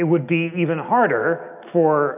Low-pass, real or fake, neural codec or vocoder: 3.6 kHz; real; none